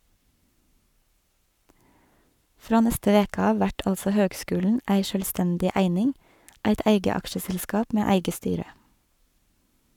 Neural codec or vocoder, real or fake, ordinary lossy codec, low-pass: codec, 44.1 kHz, 7.8 kbps, Pupu-Codec; fake; none; 19.8 kHz